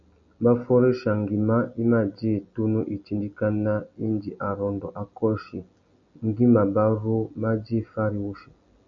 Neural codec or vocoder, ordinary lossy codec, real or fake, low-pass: none; MP3, 64 kbps; real; 7.2 kHz